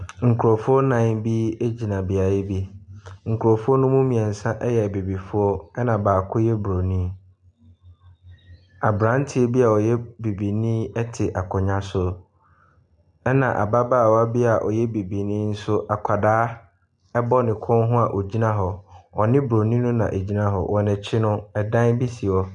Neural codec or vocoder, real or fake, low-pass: none; real; 10.8 kHz